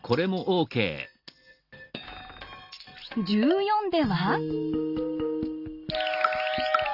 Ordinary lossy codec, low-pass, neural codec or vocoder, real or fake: Opus, 32 kbps; 5.4 kHz; none; real